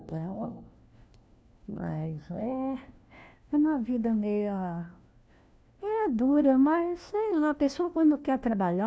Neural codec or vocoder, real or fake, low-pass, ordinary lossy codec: codec, 16 kHz, 1 kbps, FunCodec, trained on LibriTTS, 50 frames a second; fake; none; none